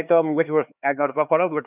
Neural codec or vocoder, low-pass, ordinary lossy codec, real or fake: codec, 16 kHz, 4 kbps, X-Codec, HuBERT features, trained on LibriSpeech; 3.6 kHz; none; fake